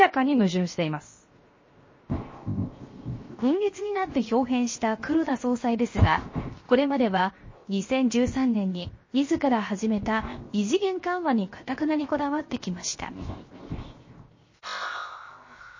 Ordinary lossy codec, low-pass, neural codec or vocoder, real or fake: MP3, 32 kbps; 7.2 kHz; codec, 16 kHz, 0.7 kbps, FocalCodec; fake